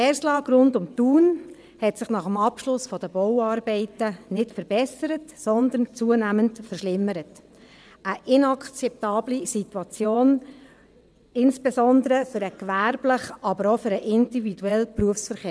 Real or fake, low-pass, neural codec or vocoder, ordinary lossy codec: fake; none; vocoder, 22.05 kHz, 80 mel bands, WaveNeXt; none